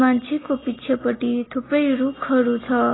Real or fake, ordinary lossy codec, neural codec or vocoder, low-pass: real; AAC, 16 kbps; none; 7.2 kHz